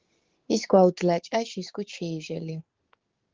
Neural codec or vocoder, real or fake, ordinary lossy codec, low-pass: codec, 16 kHz, 6 kbps, DAC; fake; Opus, 16 kbps; 7.2 kHz